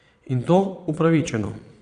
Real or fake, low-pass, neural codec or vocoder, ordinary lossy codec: fake; 9.9 kHz; vocoder, 22.05 kHz, 80 mel bands, Vocos; Opus, 64 kbps